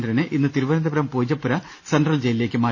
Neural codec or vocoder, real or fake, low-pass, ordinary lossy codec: none; real; none; none